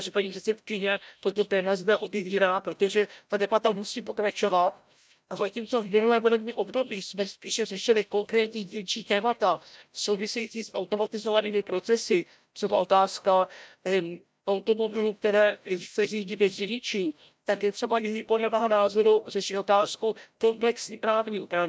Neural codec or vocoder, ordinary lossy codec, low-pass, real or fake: codec, 16 kHz, 0.5 kbps, FreqCodec, larger model; none; none; fake